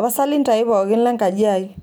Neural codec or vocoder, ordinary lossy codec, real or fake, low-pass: none; none; real; none